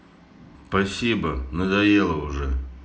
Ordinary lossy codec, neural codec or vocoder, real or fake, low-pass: none; none; real; none